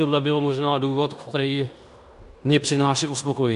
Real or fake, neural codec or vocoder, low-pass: fake; codec, 16 kHz in and 24 kHz out, 0.9 kbps, LongCat-Audio-Codec, fine tuned four codebook decoder; 10.8 kHz